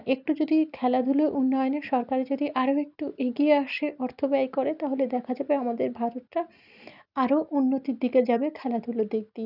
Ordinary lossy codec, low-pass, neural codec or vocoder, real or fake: none; 5.4 kHz; none; real